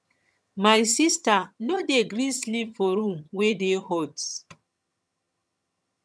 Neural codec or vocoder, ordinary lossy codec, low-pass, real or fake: vocoder, 22.05 kHz, 80 mel bands, HiFi-GAN; none; none; fake